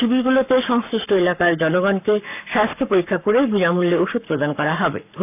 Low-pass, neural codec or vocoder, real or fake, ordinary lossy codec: 3.6 kHz; codec, 44.1 kHz, 7.8 kbps, Pupu-Codec; fake; none